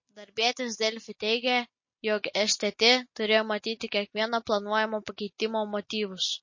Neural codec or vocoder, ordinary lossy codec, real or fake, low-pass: none; MP3, 32 kbps; real; 7.2 kHz